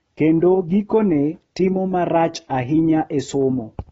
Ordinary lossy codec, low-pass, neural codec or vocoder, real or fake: AAC, 24 kbps; 19.8 kHz; none; real